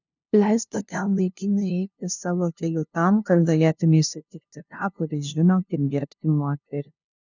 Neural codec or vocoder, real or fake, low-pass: codec, 16 kHz, 0.5 kbps, FunCodec, trained on LibriTTS, 25 frames a second; fake; 7.2 kHz